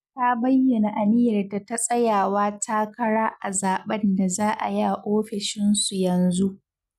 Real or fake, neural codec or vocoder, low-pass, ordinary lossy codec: real; none; 14.4 kHz; none